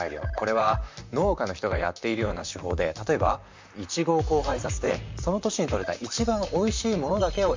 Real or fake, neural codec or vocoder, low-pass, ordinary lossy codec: fake; vocoder, 44.1 kHz, 128 mel bands, Pupu-Vocoder; 7.2 kHz; MP3, 64 kbps